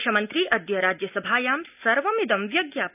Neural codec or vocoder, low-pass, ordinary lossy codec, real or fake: none; 3.6 kHz; none; real